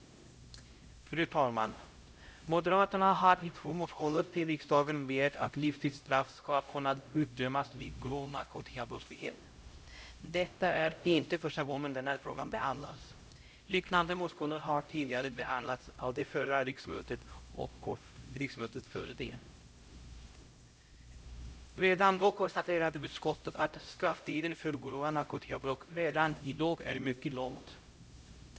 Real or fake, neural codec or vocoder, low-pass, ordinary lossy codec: fake; codec, 16 kHz, 0.5 kbps, X-Codec, HuBERT features, trained on LibriSpeech; none; none